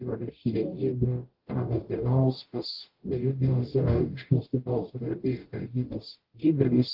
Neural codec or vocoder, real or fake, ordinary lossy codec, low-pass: codec, 44.1 kHz, 0.9 kbps, DAC; fake; Opus, 16 kbps; 5.4 kHz